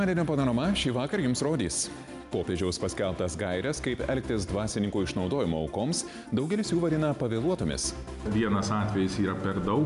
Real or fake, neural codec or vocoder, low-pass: real; none; 10.8 kHz